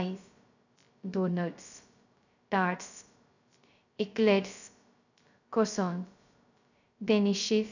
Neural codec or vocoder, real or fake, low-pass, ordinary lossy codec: codec, 16 kHz, 0.2 kbps, FocalCodec; fake; 7.2 kHz; none